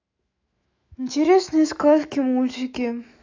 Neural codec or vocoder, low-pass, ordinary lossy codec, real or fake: autoencoder, 48 kHz, 128 numbers a frame, DAC-VAE, trained on Japanese speech; 7.2 kHz; AAC, 48 kbps; fake